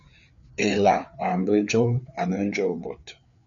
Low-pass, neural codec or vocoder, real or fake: 7.2 kHz; codec, 16 kHz, 4 kbps, FreqCodec, larger model; fake